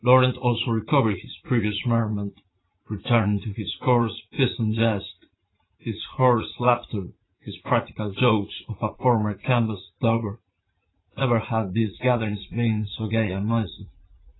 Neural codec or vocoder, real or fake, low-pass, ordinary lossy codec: vocoder, 44.1 kHz, 80 mel bands, Vocos; fake; 7.2 kHz; AAC, 16 kbps